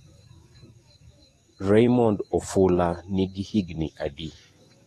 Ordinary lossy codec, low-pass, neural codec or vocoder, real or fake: AAC, 32 kbps; 19.8 kHz; codec, 44.1 kHz, 7.8 kbps, DAC; fake